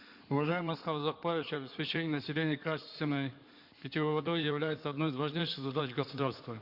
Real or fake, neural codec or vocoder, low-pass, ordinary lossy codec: fake; codec, 16 kHz in and 24 kHz out, 2.2 kbps, FireRedTTS-2 codec; 5.4 kHz; Opus, 64 kbps